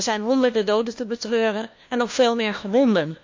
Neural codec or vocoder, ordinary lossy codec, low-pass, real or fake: codec, 16 kHz, 1 kbps, FunCodec, trained on LibriTTS, 50 frames a second; MP3, 48 kbps; 7.2 kHz; fake